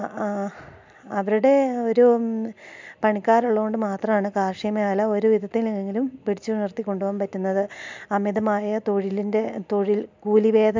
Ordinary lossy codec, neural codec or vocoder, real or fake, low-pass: MP3, 64 kbps; none; real; 7.2 kHz